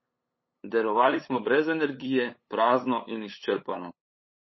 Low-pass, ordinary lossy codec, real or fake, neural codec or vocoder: 7.2 kHz; MP3, 24 kbps; fake; codec, 16 kHz, 8 kbps, FunCodec, trained on LibriTTS, 25 frames a second